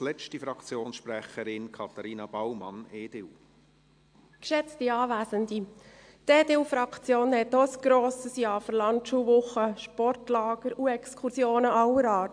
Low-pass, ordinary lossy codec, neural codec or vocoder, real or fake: 9.9 kHz; AAC, 64 kbps; none; real